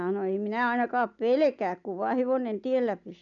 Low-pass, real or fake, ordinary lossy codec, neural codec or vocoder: 7.2 kHz; real; none; none